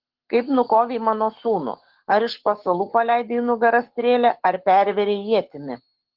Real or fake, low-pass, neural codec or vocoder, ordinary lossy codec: fake; 5.4 kHz; codec, 44.1 kHz, 7.8 kbps, Pupu-Codec; Opus, 16 kbps